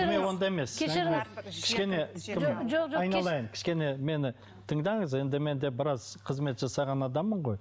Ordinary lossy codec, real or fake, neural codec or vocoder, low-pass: none; real; none; none